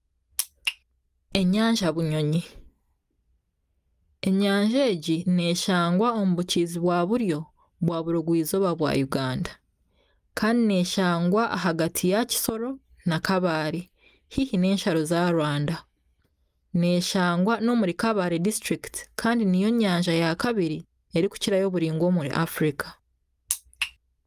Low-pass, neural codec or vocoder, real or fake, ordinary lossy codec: 14.4 kHz; none; real; Opus, 32 kbps